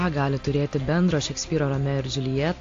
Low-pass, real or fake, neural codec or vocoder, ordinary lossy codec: 7.2 kHz; real; none; AAC, 48 kbps